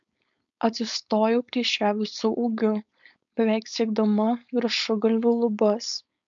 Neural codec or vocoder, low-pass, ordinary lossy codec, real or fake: codec, 16 kHz, 4.8 kbps, FACodec; 7.2 kHz; MP3, 64 kbps; fake